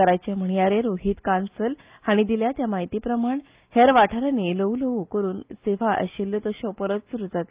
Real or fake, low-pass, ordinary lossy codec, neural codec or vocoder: real; 3.6 kHz; Opus, 24 kbps; none